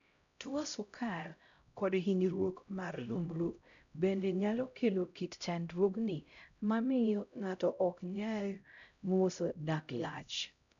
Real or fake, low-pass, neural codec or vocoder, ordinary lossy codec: fake; 7.2 kHz; codec, 16 kHz, 0.5 kbps, X-Codec, HuBERT features, trained on LibriSpeech; none